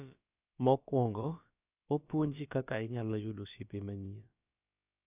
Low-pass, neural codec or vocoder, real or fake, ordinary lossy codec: 3.6 kHz; codec, 16 kHz, about 1 kbps, DyCAST, with the encoder's durations; fake; none